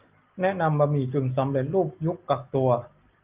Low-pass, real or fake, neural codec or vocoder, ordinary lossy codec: 3.6 kHz; real; none; Opus, 24 kbps